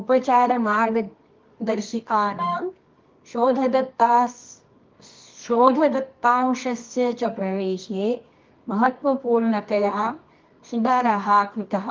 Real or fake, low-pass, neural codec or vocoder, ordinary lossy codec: fake; 7.2 kHz; codec, 24 kHz, 0.9 kbps, WavTokenizer, medium music audio release; Opus, 32 kbps